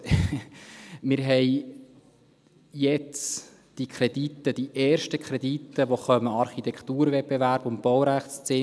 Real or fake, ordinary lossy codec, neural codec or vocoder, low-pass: real; none; none; none